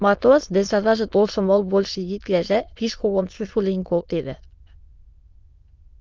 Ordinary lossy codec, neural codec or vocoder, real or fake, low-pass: Opus, 24 kbps; autoencoder, 22.05 kHz, a latent of 192 numbers a frame, VITS, trained on many speakers; fake; 7.2 kHz